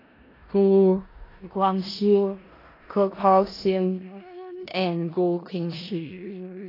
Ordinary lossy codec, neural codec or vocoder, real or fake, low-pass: AAC, 24 kbps; codec, 16 kHz in and 24 kHz out, 0.4 kbps, LongCat-Audio-Codec, four codebook decoder; fake; 5.4 kHz